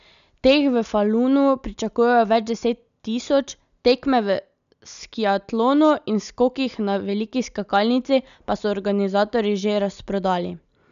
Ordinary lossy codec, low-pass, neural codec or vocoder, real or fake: none; 7.2 kHz; none; real